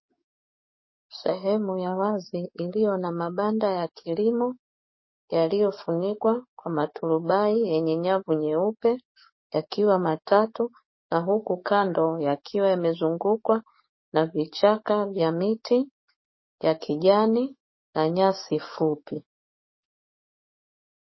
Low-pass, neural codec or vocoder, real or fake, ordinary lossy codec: 7.2 kHz; codec, 44.1 kHz, 7.8 kbps, DAC; fake; MP3, 24 kbps